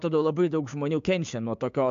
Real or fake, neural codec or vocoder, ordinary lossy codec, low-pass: fake; codec, 16 kHz, 6 kbps, DAC; AAC, 64 kbps; 7.2 kHz